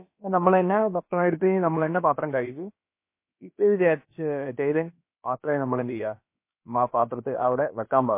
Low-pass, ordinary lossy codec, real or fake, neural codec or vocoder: 3.6 kHz; MP3, 24 kbps; fake; codec, 16 kHz, about 1 kbps, DyCAST, with the encoder's durations